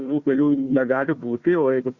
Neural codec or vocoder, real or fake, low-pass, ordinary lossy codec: codec, 16 kHz, 1 kbps, FunCodec, trained on Chinese and English, 50 frames a second; fake; 7.2 kHz; Opus, 64 kbps